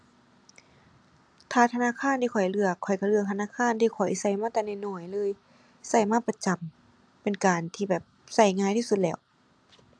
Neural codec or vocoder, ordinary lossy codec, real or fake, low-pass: none; AAC, 64 kbps; real; 9.9 kHz